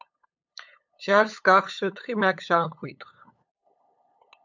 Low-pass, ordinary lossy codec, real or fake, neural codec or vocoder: 7.2 kHz; MP3, 64 kbps; fake; codec, 16 kHz, 8 kbps, FunCodec, trained on LibriTTS, 25 frames a second